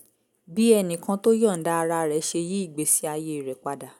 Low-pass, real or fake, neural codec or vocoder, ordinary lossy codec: 19.8 kHz; real; none; none